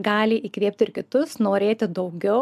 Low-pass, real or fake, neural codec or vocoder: 14.4 kHz; real; none